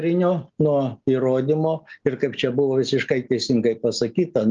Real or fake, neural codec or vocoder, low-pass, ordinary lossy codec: real; none; 7.2 kHz; Opus, 32 kbps